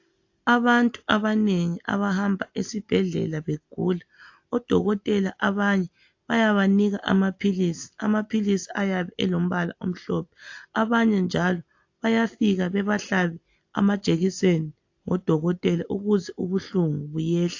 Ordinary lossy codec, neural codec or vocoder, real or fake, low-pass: AAC, 48 kbps; none; real; 7.2 kHz